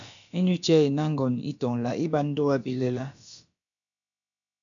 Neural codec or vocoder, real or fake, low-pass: codec, 16 kHz, about 1 kbps, DyCAST, with the encoder's durations; fake; 7.2 kHz